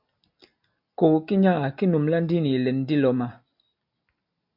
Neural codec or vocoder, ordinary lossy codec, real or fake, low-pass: none; AAC, 48 kbps; real; 5.4 kHz